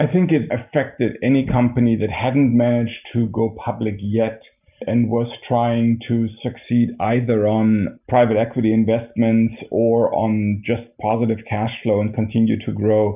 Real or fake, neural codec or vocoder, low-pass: real; none; 3.6 kHz